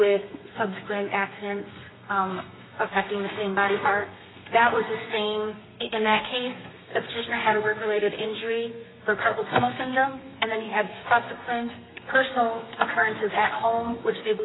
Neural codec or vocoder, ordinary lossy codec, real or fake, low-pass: codec, 32 kHz, 1.9 kbps, SNAC; AAC, 16 kbps; fake; 7.2 kHz